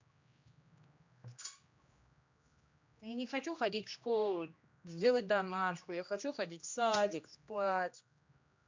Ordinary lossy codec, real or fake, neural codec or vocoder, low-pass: MP3, 64 kbps; fake; codec, 16 kHz, 1 kbps, X-Codec, HuBERT features, trained on general audio; 7.2 kHz